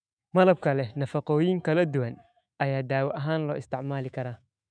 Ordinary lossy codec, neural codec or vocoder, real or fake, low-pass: none; autoencoder, 48 kHz, 128 numbers a frame, DAC-VAE, trained on Japanese speech; fake; 9.9 kHz